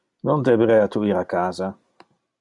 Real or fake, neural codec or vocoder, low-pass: real; none; 10.8 kHz